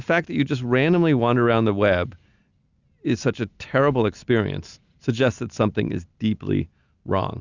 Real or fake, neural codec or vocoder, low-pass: real; none; 7.2 kHz